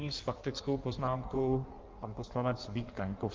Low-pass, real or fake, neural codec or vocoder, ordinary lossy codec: 7.2 kHz; fake; codec, 16 kHz in and 24 kHz out, 1.1 kbps, FireRedTTS-2 codec; Opus, 24 kbps